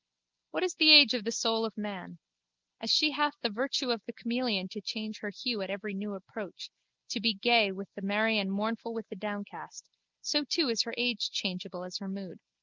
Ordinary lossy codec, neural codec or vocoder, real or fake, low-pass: Opus, 24 kbps; none; real; 7.2 kHz